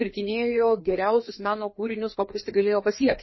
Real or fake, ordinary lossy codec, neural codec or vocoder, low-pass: fake; MP3, 24 kbps; codec, 16 kHz, 2 kbps, FreqCodec, larger model; 7.2 kHz